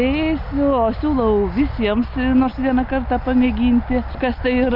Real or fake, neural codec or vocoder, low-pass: real; none; 5.4 kHz